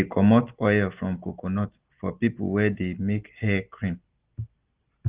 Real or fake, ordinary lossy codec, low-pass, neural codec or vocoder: real; Opus, 16 kbps; 3.6 kHz; none